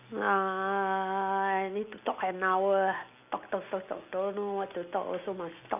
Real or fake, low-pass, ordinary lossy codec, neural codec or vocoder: real; 3.6 kHz; none; none